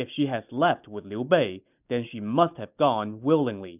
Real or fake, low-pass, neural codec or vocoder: real; 3.6 kHz; none